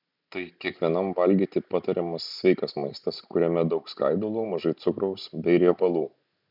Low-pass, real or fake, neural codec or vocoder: 5.4 kHz; real; none